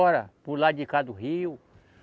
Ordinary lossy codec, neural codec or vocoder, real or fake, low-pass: none; none; real; none